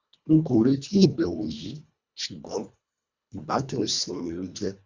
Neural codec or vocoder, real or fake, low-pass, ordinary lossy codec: codec, 24 kHz, 1.5 kbps, HILCodec; fake; 7.2 kHz; Opus, 64 kbps